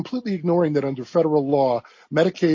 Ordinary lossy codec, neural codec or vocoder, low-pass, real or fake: MP3, 32 kbps; none; 7.2 kHz; real